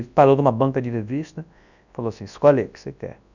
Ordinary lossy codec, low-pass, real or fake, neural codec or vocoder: none; 7.2 kHz; fake; codec, 24 kHz, 0.9 kbps, WavTokenizer, large speech release